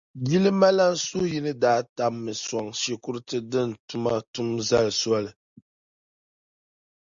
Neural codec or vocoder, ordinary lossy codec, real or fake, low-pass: none; Opus, 64 kbps; real; 7.2 kHz